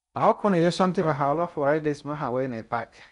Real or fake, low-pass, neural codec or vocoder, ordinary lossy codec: fake; 10.8 kHz; codec, 16 kHz in and 24 kHz out, 0.6 kbps, FocalCodec, streaming, 4096 codes; none